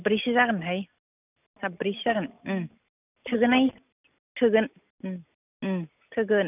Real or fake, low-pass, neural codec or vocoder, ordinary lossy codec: real; 3.6 kHz; none; none